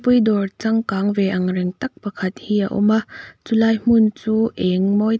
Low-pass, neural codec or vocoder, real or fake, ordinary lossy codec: none; none; real; none